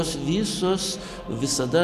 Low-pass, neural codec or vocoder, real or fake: 14.4 kHz; none; real